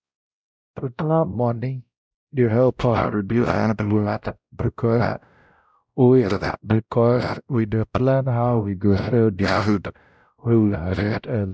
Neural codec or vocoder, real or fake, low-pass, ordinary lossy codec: codec, 16 kHz, 0.5 kbps, X-Codec, WavLM features, trained on Multilingual LibriSpeech; fake; none; none